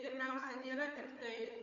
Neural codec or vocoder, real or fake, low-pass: codec, 16 kHz, 4 kbps, FunCodec, trained on Chinese and English, 50 frames a second; fake; 7.2 kHz